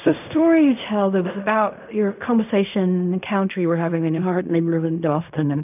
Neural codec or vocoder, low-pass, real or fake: codec, 16 kHz in and 24 kHz out, 0.4 kbps, LongCat-Audio-Codec, fine tuned four codebook decoder; 3.6 kHz; fake